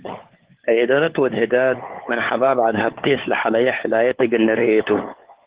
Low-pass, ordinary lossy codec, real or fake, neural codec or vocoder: 3.6 kHz; Opus, 16 kbps; fake; codec, 16 kHz, 4 kbps, FunCodec, trained on LibriTTS, 50 frames a second